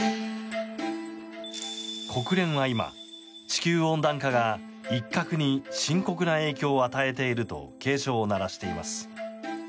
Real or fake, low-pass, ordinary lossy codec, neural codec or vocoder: real; none; none; none